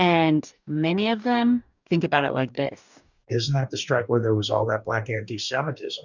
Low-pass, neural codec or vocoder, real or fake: 7.2 kHz; codec, 44.1 kHz, 2.6 kbps, DAC; fake